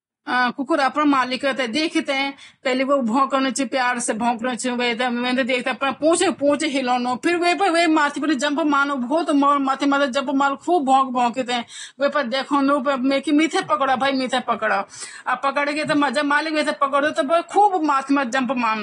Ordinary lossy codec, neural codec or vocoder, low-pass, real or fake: AAC, 32 kbps; none; 10.8 kHz; real